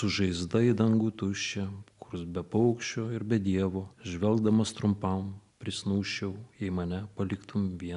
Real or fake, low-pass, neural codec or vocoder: real; 10.8 kHz; none